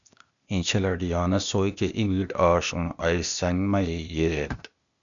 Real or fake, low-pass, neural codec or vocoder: fake; 7.2 kHz; codec, 16 kHz, 0.8 kbps, ZipCodec